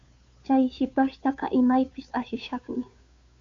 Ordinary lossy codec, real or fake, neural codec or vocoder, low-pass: MP3, 64 kbps; fake; codec, 16 kHz, 2 kbps, FunCodec, trained on Chinese and English, 25 frames a second; 7.2 kHz